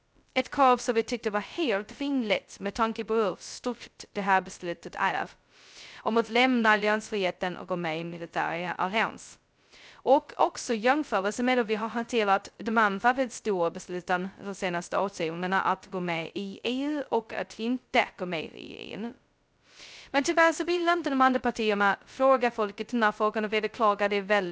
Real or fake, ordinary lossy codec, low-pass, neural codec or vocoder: fake; none; none; codec, 16 kHz, 0.2 kbps, FocalCodec